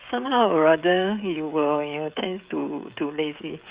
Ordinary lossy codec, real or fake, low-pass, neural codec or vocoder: Opus, 64 kbps; fake; 3.6 kHz; codec, 16 kHz, 16 kbps, FreqCodec, smaller model